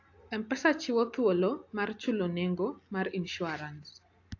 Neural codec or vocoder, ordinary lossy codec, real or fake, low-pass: none; AAC, 48 kbps; real; 7.2 kHz